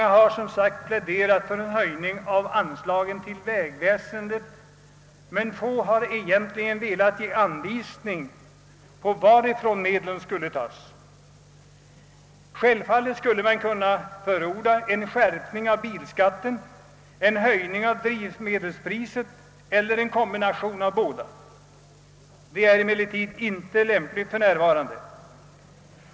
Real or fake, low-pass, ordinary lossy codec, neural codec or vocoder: real; none; none; none